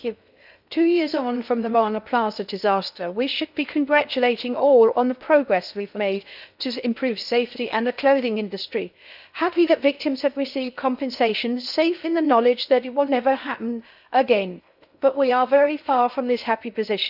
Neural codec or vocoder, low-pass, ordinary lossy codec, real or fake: codec, 16 kHz in and 24 kHz out, 0.6 kbps, FocalCodec, streaming, 2048 codes; 5.4 kHz; none; fake